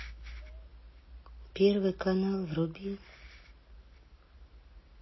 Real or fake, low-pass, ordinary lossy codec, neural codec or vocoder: real; 7.2 kHz; MP3, 24 kbps; none